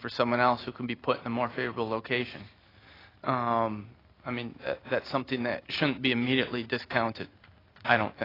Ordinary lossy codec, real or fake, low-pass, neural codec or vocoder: AAC, 24 kbps; real; 5.4 kHz; none